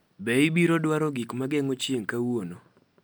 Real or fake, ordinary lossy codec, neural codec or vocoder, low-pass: real; none; none; none